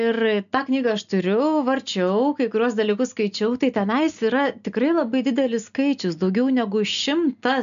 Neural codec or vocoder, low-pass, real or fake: none; 7.2 kHz; real